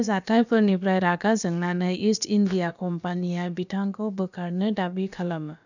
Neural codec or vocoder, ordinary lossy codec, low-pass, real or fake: codec, 16 kHz, about 1 kbps, DyCAST, with the encoder's durations; none; 7.2 kHz; fake